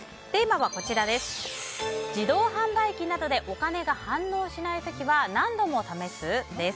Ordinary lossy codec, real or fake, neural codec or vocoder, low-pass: none; real; none; none